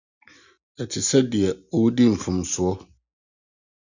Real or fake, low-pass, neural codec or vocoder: real; 7.2 kHz; none